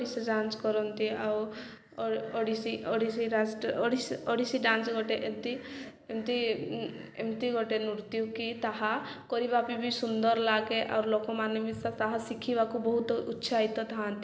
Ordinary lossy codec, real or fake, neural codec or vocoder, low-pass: none; real; none; none